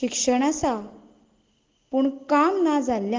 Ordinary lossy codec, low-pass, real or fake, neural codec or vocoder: Opus, 16 kbps; 7.2 kHz; real; none